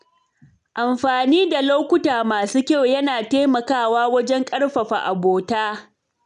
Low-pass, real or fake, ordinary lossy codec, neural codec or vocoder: 10.8 kHz; real; none; none